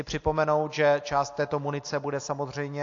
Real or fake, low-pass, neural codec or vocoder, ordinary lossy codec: real; 7.2 kHz; none; AAC, 48 kbps